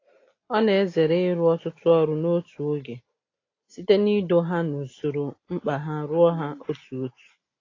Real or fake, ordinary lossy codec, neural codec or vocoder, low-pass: real; AAC, 32 kbps; none; 7.2 kHz